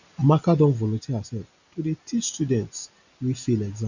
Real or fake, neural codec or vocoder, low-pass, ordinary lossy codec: real; none; 7.2 kHz; none